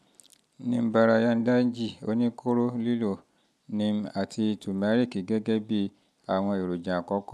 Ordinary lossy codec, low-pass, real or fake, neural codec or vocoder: none; none; real; none